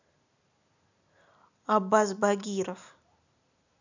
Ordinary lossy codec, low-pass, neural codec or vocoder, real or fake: none; 7.2 kHz; none; real